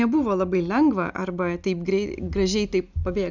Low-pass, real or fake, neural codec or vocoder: 7.2 kHz; real; none